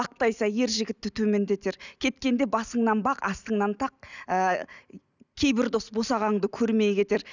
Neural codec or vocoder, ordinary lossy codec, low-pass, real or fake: none; none; 7.2 kHz; real